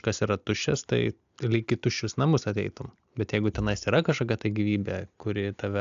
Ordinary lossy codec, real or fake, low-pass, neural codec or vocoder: AAC, 96 kbps; real; 7.2 kHz; none